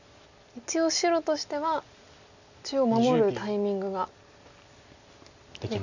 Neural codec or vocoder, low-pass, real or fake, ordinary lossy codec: none; 7.2 kHz; real; none